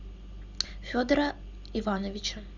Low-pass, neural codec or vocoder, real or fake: 7.2 kHz; none; real